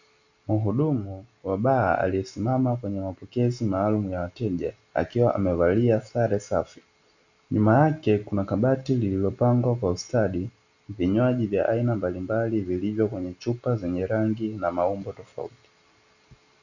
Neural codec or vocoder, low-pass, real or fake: none; 7.2 kHz; real